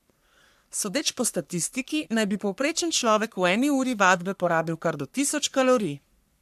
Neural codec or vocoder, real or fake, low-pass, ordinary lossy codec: codec, 44.1 kHz, 3.4 kbps, Pupu-Codec; fake; 14.4 kHz; AAC, 96 kbps